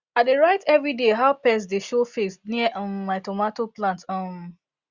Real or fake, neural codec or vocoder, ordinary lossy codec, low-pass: real; none; Opus, 64 kbps; 7.2 kHz